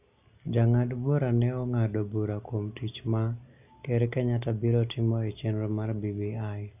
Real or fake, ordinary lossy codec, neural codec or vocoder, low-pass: real; none; none; 3.6 kHz